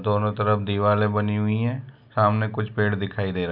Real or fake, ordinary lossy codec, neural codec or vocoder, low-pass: real; none; none; 5.4 kHz